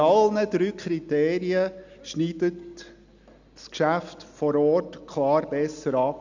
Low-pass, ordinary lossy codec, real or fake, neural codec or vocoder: 7.2 kHz; none; real; none